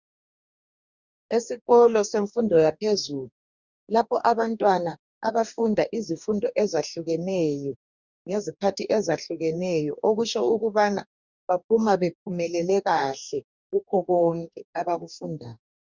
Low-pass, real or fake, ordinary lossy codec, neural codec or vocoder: 7.2 kHz; fake; Opus, 64 kbps; codec, 44.1 kHz, 2.6 kbps, DAC